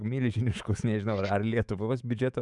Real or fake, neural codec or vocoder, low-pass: fake; autoencoder, 48 kHz, 128 numbers a frame, DAC-VAE, trained on Japanese speech; 10.8 kHz